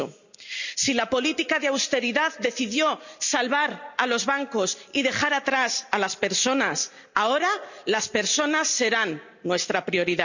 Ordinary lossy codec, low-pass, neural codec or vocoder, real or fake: none; 7.2 kHz; none; real